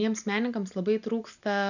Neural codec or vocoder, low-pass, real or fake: none; 7.2 kHz; real